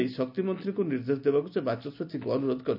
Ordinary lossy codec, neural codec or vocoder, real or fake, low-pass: none; none; real; 5.4 kHz